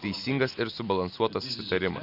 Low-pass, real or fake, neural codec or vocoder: 5.4 kHz; real; none